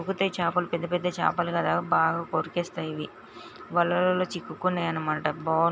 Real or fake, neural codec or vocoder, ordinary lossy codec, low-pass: real; none; none; none